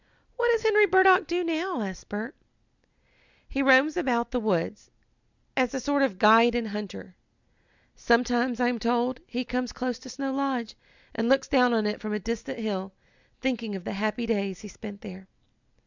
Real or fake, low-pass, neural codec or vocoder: real; 7.2 kHz; none